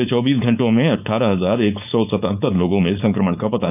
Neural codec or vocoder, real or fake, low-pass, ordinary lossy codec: codec, 16 kHz, 4.8 kbps, FACodec; fake; 3.6 kHz; none